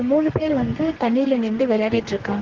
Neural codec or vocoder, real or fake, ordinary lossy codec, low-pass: codec, 32 kHz, 1.9 kbps, SNAC; fake; Opus, 16 kbps; 7.2 kHz